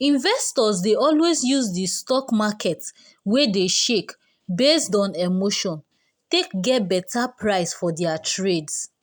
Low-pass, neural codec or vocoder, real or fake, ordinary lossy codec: none; none; real; none